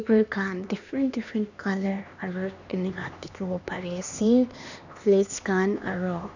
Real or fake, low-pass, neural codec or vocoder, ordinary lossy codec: fake; 7.2 kHz; codec, 16 kHz, 2 kbps, X-Codec, HuBERT features, trained on LibriSpeech; none